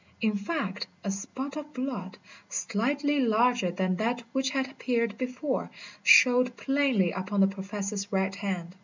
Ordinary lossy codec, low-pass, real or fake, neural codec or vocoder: MP3, 64 kbps; 7.2 kHz; real; none